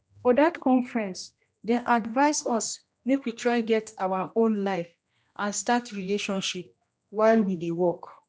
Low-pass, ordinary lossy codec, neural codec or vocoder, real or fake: none; none; codec, 16 kHz, 1 kbps, X-Codec, HuBERT features, trained on general audio; fake